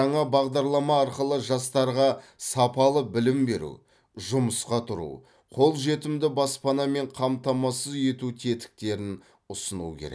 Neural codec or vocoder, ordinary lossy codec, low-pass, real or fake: none; none; none; real